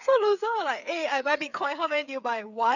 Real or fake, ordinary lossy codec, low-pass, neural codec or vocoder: fake; none; 7.2 kHz; codec, 16 kHz, 8 kbps, FreqCodec, smaller model